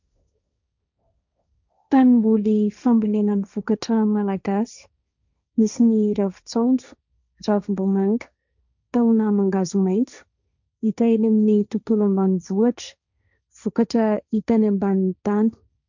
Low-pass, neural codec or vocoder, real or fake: 7.2 kHz; codec, 16 kHz, 1.1 kbps, Voila-Tokenizer; fake